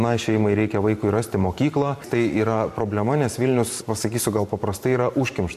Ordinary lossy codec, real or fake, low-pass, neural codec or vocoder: MP3, 64 kbps; fake; 14.4 kHz; vocoder, 44.1 kHz, 128 mel bands every 512 samples, BigVGAN v2